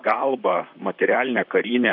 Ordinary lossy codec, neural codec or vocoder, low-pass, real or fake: AAC, 48 kbps; vocoder, 44.1 kHz, 128 mel bands, Pupu-Vocoder; 5.4 kHz; fake